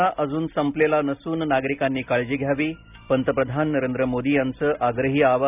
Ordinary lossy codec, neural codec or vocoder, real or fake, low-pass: none; none; real; 3.6 kHz